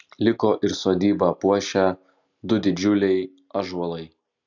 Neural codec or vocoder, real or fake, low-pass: none; real; 7.2 kHz